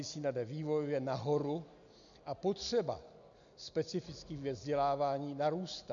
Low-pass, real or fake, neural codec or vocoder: 7.2 kHz; real; none